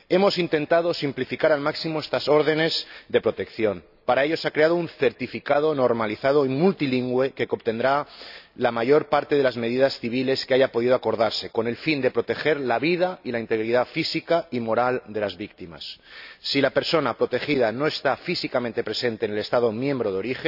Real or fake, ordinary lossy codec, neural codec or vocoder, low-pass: real; none; none; 5.4 kHz